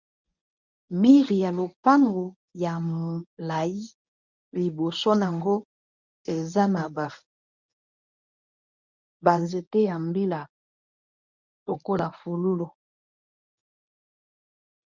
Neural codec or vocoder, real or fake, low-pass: codec, 24 kHz, 0.9 kbps, WavTokenizer, medium speech release version 1; fake; 7.2 kHz